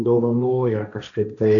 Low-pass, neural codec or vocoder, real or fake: 7.2 kHz; codec, 16 kHz, 1.1 kbps, Voila-Tokenizer; fake